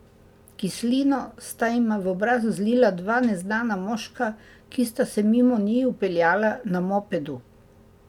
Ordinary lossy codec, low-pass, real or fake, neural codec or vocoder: none; 19.8 kHz; real; none